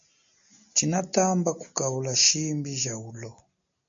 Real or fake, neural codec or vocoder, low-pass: real; none; 7.2 kHz